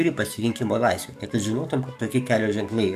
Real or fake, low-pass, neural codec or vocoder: fake; 14.4 kHz; codec, 44.1 kHz, 7.8 kbps, DAC